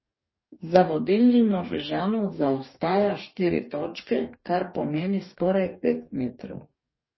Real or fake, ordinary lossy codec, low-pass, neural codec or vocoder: fake; MP3, 24 kbps; 7.2 kHz; codec, 44.1 kHz, 2.6 kbps, DAC